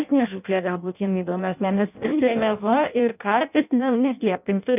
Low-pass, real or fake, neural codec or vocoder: 3.6 kHz; fake; codec, 16 kHz in and 24 kHz out, 0.6 kbps, FireRedTTS-2 codec